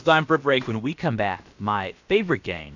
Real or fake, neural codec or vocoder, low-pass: fake; codec, 16 kHz, about 1 kbps, DyCAST, with the encoder's durations; 7.2 kHz